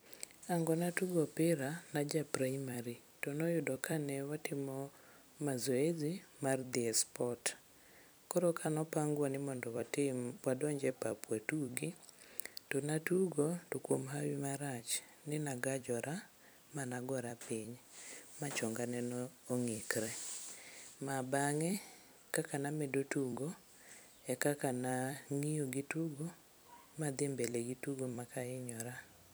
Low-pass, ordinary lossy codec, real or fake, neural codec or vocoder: none; none; fake; vocoder, 44.1 kHz, 128 mel bands every 256 samples, BigVGAN v2